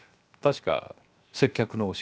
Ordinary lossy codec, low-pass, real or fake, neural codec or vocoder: none; none; fake; codec, 16 kHz, 0.7 kbps, FocalCodec